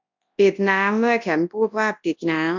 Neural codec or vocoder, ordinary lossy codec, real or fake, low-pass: codec, 24 kHz, 0.9 kbps, WavTokenizer, large speech release; MP3, 64 kbps; fake; 7.2 kHz